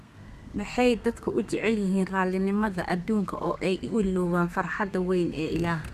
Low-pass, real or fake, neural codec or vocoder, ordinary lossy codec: 14.4 kHz; fake; codec, 32 kHz, 1.9 kbps, SNAC; none